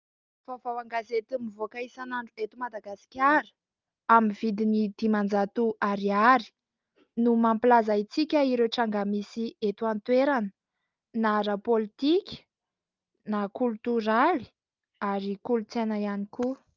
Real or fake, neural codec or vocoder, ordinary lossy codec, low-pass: real; none; Opus, 24 kbps; 7.2 kHz